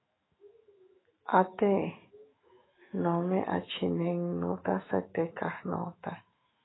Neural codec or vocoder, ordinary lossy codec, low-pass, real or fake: autoencoder, 48 kHz, 128 numbers a frame, DAC-VAE, trained on Japanese speech; AAC, 16 kbps; 7.2 kHz; fake